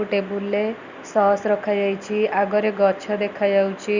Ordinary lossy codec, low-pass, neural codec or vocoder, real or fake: Opus, 64 kbps; 7.2 kHz; none; real